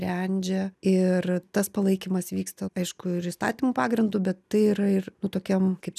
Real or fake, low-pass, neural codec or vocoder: real; 14.4 kHz; none